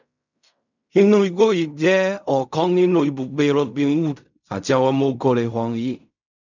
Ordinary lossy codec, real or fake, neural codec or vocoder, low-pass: none; fake; codec, 16 kHz in and 24 kHz out, 0.4 kbps, LongCat-Audio-Codec, fine tuned four codebook decoder; 7.2 kHz